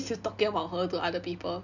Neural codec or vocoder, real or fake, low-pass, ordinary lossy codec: none; real; 7.2 kHz; none